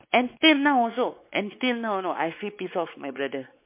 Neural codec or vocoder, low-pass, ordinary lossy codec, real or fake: codec, 16 kHz, 4 kbps, X-Codec, WavLM features, trained on Multilingual LibriSpeech; 3.6 kHz; MP3, 24 kbps; fake